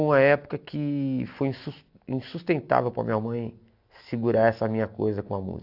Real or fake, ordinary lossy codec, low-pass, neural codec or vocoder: real; AAC, 48 kbps; 5.4 kHz; none